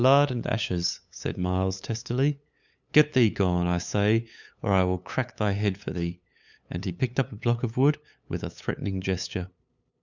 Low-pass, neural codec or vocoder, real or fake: 7.2 kHz; codec, 16 kHz, 8 kbps, FunCodec, trained on LibriTTS, 25 frames a second; fake